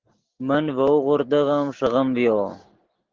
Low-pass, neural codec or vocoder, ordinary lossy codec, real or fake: 7.2 kHz; none; Opus, 16 kbps; real